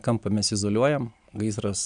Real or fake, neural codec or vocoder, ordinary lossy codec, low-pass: real; none; MP3, 96 kbps; 9.9 kHz